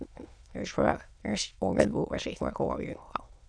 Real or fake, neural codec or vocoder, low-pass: fake; autoencoder, 22.05 kHz, a latent of 192 numbers a frame, VITS, trained on many speakers; 9.9 kHz